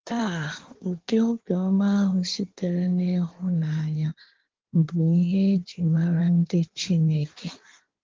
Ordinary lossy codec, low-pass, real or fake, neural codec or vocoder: Opus, 16 kbps; 7.2 kHz; fake; codec, 16 kHz in and 24 kHz out, 1.1 kbps, FireRedTTS-2 codec